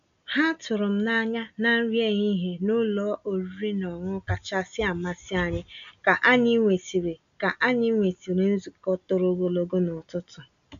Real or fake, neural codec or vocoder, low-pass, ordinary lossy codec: real; none; 7.2 kHz; none